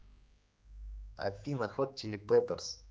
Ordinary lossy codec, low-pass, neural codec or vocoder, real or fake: none; none; codec, 16 kHz, 2 kbps, X-Codec, HuBERT features, trained on general audio; fake